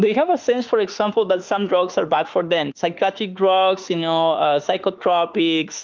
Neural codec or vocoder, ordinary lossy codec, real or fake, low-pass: autoencoder, 48 kHz, 32 numbers a frame, DAC-VAE, trained on Japanese speech; Opus, 24 kbps; fake; 7.2 kHz